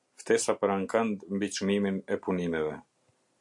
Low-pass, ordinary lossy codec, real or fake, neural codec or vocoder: 10.8 kHz; MP3, 64 kbps; real; none